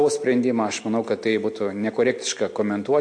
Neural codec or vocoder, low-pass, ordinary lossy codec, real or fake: none; 9.9 kHz; MP3, 48 kbps; real